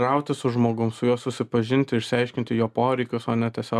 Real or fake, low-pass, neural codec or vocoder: real; 14.4 kHz; none